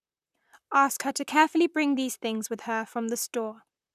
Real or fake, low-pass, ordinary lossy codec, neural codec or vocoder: fake; 14.4 kHz; none; vocoder, 44.1 kHz, 128 mel bands, Pupu-Vocoder